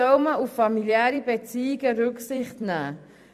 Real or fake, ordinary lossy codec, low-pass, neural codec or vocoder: fake; MP3, 96 kbps; 14.4 kHz; vocoder, 44.1 kHz, 128 mel bands every 256 samples, BigVGAN v2